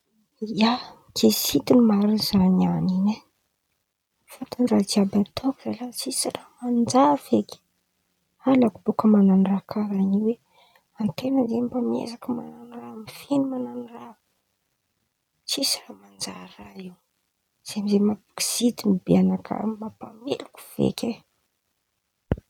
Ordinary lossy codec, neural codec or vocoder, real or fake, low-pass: none; none; real; 19.8 kHz